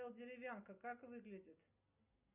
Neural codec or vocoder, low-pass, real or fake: none; 3.6 kHz; real